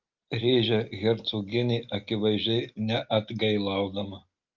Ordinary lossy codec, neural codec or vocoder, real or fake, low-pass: Opus, 32 kbps; none; real; 7.2 kHz